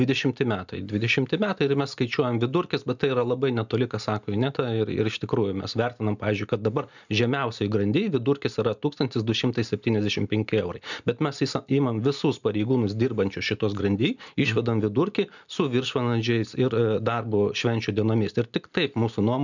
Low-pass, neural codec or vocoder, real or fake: 7.2 kHz; none; real